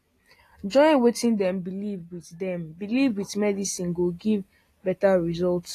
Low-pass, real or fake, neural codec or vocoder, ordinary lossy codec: 14.4 kHz; real; none; AAC, 48 kbps